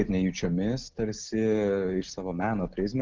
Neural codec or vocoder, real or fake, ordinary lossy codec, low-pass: none; real; Opus, 16 kbps; 7.2 kHz